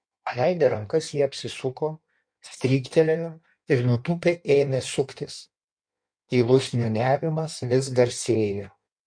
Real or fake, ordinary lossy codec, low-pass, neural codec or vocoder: fake; MP3, 64 kbps; 9.9 kHz; codec, 16 kHz in and 24 kHz out, 1.1 kbps, FireRedTTS-2 codec